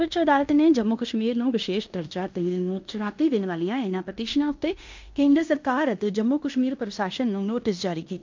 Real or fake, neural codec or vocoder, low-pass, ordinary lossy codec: fake; codec, 16 kHz in and 24 kHz out, 0.9 kbps, LongCat-Audio-Codec, fine tuned four codebook decoder; 7.2 kHz; MP3, 64 kbps